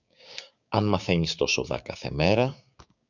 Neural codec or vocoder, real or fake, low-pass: codec, 16 kHz, 6 kbps, DAC; fake; 7.2 kHz